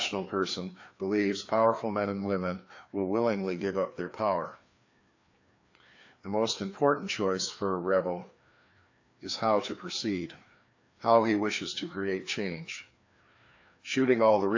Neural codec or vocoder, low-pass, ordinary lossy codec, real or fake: codec, 16 kHz, 2 kbps, FreqCodec, larger model; 7.2 kHz; AAC, 48 kbps; fake